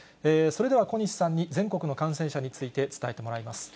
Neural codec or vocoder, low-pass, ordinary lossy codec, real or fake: none; none; none; real